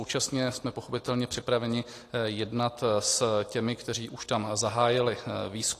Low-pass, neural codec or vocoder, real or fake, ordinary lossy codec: 14.4 kHz; none; real; AAC, 48 kbps